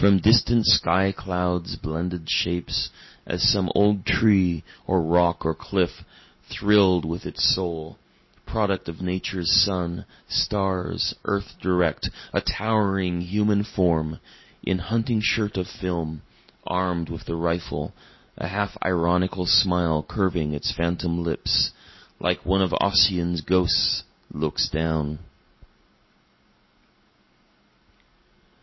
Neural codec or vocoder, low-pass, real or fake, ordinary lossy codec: none; 7.2 kHz; real; MP3, 24 kbps